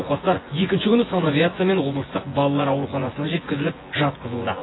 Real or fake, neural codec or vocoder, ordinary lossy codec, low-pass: fake; vocoder, 24 kHz, 100 mel bands, Vocos; AAC, 16 kbps; 7.2 kHz